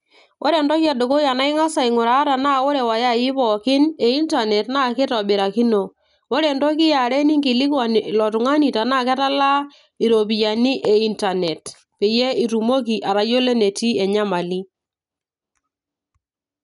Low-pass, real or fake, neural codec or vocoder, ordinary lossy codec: 10.8 kHz; real; none; none